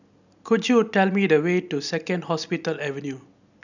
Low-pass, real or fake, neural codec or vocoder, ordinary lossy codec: 7.2 kHz; real; none; none